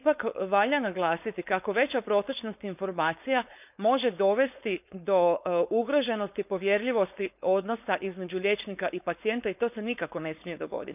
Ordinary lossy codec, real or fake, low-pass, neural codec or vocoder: none; fake; 3.6 kHz; codec, 16 kHz, 4.8 kbps, FACodec